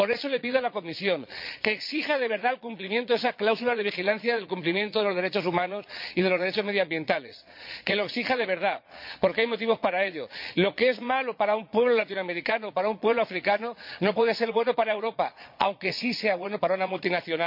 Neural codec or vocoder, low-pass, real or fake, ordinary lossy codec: vocoder, 22.05 kHz, 80 mel bands, Vocos; 5.4 kHz; fake; MP3, 48 kbps